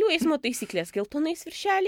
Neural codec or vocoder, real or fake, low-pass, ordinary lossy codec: none; real; 19.8 kHz; MP3, 96 kbps